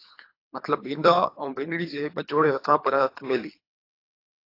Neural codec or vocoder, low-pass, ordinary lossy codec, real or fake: codec, 24 kHz, 3 kbps, HILCodec; 5.4 kHz; AAC, 32 kbps; fake